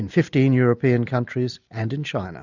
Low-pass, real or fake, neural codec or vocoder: 7.2 kHz; real; none